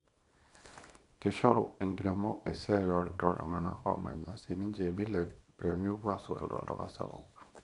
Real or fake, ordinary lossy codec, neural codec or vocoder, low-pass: fake; none; codec, 24 kHz, 0.9 kbps, WavTokenizer, small release; 10.8 kHz